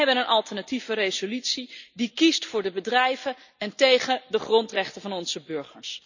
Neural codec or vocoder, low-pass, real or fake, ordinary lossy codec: none; 7.2 kHz; real; none